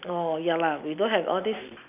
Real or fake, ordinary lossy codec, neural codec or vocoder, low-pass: real; none; none; 3.6 kHz